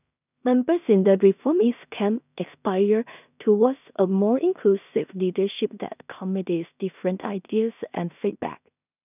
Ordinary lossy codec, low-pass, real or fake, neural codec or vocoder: none; 3.6 kHz; fake; codec, 16 kHz in and 24 kHz out, 0.4 kbps, LongCat-Audio-Codec, two codebook decoder